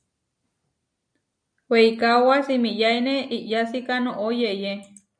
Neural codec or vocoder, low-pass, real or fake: none; 9.9 kHz; real